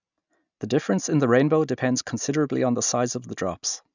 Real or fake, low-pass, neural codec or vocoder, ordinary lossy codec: real; 7.2 kHz; none; none